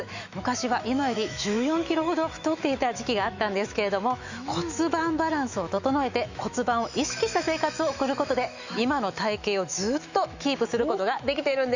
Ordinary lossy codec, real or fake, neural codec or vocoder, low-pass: Opus, 64 kbps; fake; autoencoder, 48 kHz, 128 numbers a frame, DAC-VAE, trained on Japanese speech; 7.2 kHz